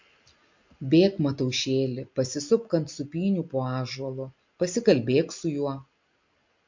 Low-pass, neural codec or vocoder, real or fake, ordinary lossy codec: 7.2 kHz; none; real; MP3, 48 kbps